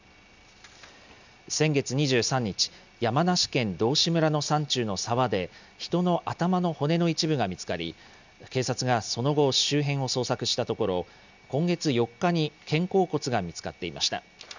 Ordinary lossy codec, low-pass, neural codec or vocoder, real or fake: none; 7.2 kHz; none; real